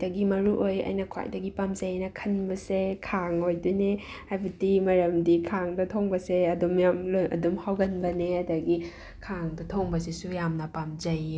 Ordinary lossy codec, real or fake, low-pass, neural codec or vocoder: none; real; none; none